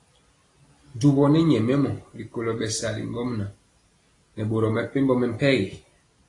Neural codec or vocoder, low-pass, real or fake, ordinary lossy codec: none; 10.8 kHz; real; AAC, 32 kbps